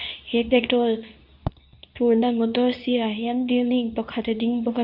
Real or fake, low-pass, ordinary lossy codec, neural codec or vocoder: fake; 5.4 kHz; none; codec, 24 kHz, 0.9 kbps, WavTokenizer, medium speech release version 2